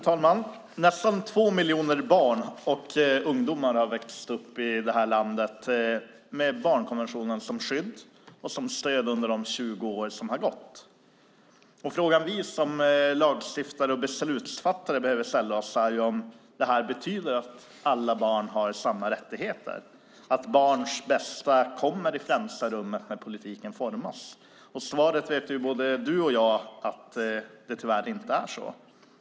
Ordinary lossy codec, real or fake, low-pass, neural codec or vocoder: none; real; none; none